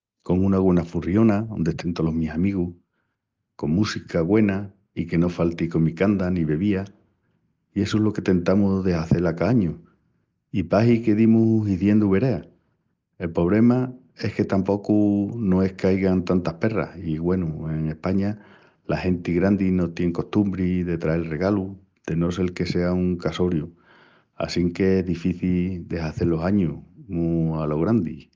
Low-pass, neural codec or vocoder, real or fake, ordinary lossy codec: 7.2 kHz; none; real; Opus, 24 kbps